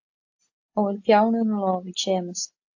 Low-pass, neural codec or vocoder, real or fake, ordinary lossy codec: 7.2 kHz; none; real; AAC, 48 kbps